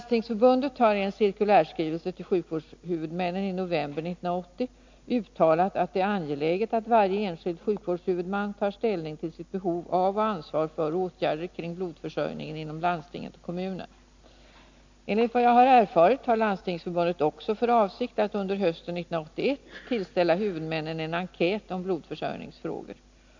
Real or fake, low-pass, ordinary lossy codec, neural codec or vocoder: real; 7.2 kHz; MP3, 64 kbps; none